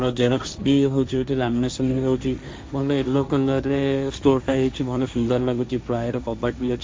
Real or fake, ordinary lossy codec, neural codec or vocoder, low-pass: fake; none; codec, 16 kHz, 1.1 kbps, Voila-Tokenizer; none